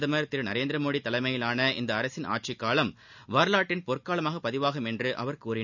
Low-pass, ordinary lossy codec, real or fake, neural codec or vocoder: 7.2 kHz; none; real; none